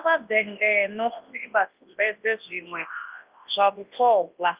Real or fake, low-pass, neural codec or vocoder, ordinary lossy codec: fake; 3.6 kHz; codec, 24 kHz, 0.9 kbps, WavTokenizer, large speech release; Opus, 24 kbps